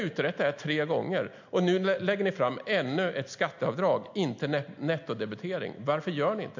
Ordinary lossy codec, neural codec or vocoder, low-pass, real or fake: MP3, 48 kbps; none; 7.2 kHz; real